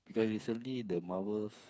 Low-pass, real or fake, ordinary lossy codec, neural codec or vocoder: none; fake; none; codec, 16 kHz, 4 kbps, FreqCodec, smaller model